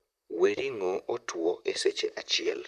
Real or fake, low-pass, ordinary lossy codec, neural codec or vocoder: fake; 14.4 kHz; none; vocoder, 44.1 kHz, 128 mel bands, Pupu-Vocoder